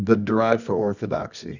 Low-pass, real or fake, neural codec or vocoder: 7.2 kHz; fake; codec, 24 kHz, 0.9 kbps, WavTokenizer, medium music audio release